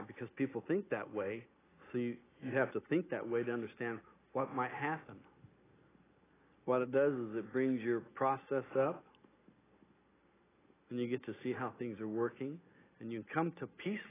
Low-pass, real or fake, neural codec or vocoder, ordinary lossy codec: 3.6 kHz; real; none; AAC, 16 kbps